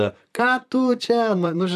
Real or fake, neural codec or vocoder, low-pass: fake; vocoder, 44.1 kHz, 128 mel bands, Pupu-Vocoder; 14.4 kHz